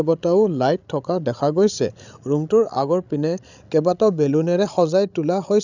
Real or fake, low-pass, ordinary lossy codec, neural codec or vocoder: fake; 7.2 kHz; none; codec, 16 kHz, 16 kbps, FreqCodec, larger model